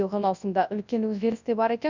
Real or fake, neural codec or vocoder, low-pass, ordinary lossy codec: fake; codec, 24 kHz, 0.9 kbps, WavTokenizer, large speech release; 7.2 kHz; none